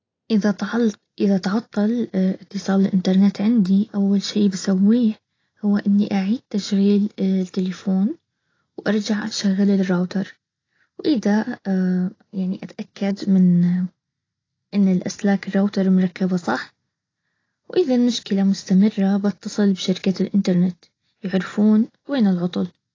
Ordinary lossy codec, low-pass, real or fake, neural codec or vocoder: AAC, 32 kbps; 7.2 kHz; real; none